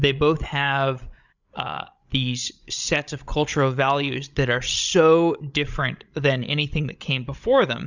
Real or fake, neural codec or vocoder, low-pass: fake; codec, 16 kHz, 16 kbps, FreqCodec, larger model; 7.2 kHz